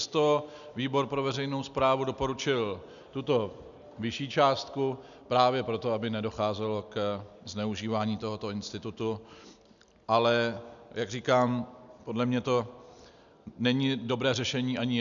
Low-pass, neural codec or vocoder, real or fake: 7.2 kHz; none; real